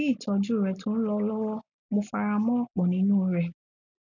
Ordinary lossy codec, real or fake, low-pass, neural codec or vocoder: none; real; 7.2 kHz; none